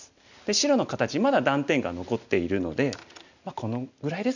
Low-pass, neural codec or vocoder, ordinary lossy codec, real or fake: 7.2 kHz; none; none; real